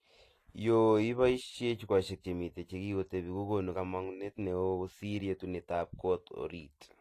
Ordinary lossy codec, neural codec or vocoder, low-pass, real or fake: AAC, 48 kbps; none; 14.4 kHz; real